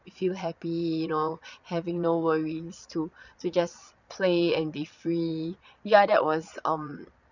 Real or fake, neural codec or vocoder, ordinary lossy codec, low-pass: fake; vocoder, 22.05 kHz, 80 mel bands, Vocos; none; 7.2 kHz